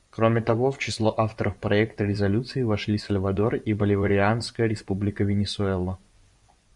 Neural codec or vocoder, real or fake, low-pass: vocoder, 24 kHz, 100 mel bands, Vocos; fake; 10.8 kHz